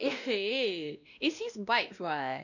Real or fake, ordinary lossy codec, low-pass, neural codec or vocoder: fake; none; 7.2 kHz; codec, 16 kHz, 1 kbps, X-Codec, WavLM features, trained on Multilingual LibriSpeech